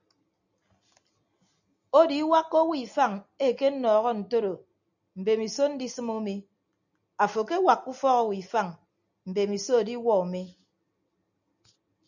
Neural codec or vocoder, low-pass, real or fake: none; 7.2 kHz; real